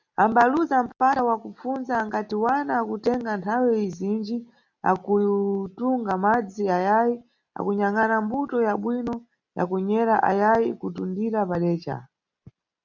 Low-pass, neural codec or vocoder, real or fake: 7.2 kHz; none; real